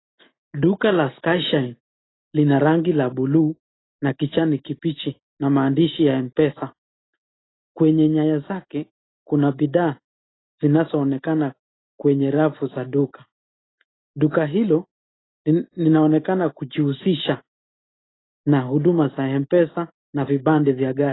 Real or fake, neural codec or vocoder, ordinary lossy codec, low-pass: real; none; AAC, 16 kbps; 7.2 kHz